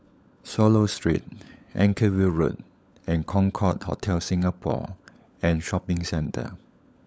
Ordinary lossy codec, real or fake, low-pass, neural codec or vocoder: none; fake; none; codec, 16 kHz, 16 kbps, FunCodec, trained on LibriTTS, 50 frames a second